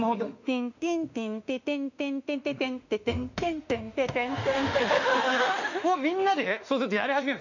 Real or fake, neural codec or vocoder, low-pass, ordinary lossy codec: fake; autoencoder, 48 kHz, 32 numbers a frame, DAC-VAE, trained on Japanese speech; 7.2 kHz; none